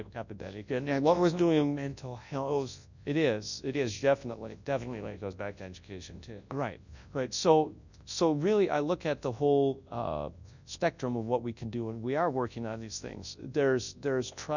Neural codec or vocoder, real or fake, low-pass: codec, 24 kHz, 0.9 kbps, WavTokenizer, large speech release; fake; 7.2 kHz